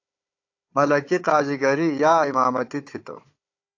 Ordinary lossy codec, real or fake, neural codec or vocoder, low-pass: AAC, 48 kbps; fake; codec, 16 kHz, 4 kbps, FunCodec, trained on Chinese and English, 50 frames a second; 7.2 kHz